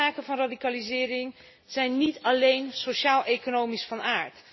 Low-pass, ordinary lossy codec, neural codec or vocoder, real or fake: 7.2 kHz; MP3, 24 kbps; none; real